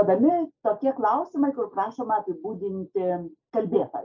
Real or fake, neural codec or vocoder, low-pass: real; none; 7.2 kHz